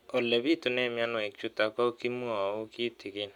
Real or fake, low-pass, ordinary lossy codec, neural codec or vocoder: real; 19.8 kHz; none; none